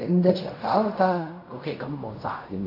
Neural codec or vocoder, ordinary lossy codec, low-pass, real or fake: codec, 16 kHz in and 24 kHz out, 0.4 kbps, LongCat-Audio-Codec, fine tuned four codebook decoder; AAC, 48 kbps; 5.4 kHz; fake